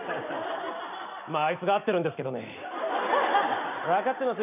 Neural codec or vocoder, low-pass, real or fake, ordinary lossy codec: none; 3.6 kHz; real; none